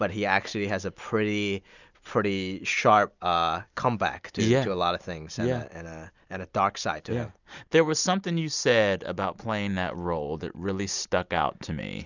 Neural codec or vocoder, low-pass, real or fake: none; 7.2 kHz; real